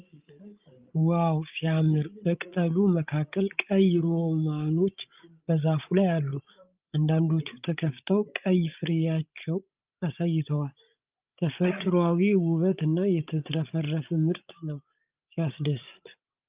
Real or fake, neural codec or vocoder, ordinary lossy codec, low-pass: fake; codec, 16 kHz, 16 kbps, FreqCodec, larger model; Opus, 32 kbps; 3.6 kHz